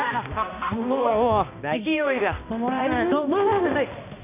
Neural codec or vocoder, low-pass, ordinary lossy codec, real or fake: codec, 16 kHz, 1 kbps, X-Codec, HuBERT features, trained on balanced general audio; 3.6 kHz; none; fake